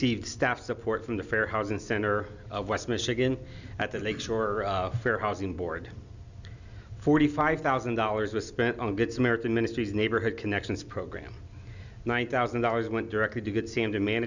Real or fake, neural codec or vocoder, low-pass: real; none; 7.2 kHz